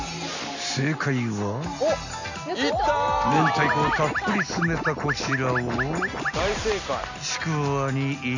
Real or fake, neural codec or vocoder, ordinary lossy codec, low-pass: real; none; none; 7.2 kHz